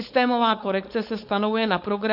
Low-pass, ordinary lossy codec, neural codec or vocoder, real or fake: 5.4 kHz; AAC, 48 kbps; codec, 16 kHz, 4.8 kbps, FACodec; fake